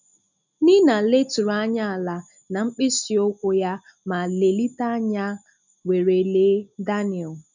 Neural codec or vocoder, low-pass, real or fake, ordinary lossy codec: none; 7.2 kHz; real; none